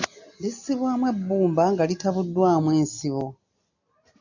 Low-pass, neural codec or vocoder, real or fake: 7.2 kHz; none; real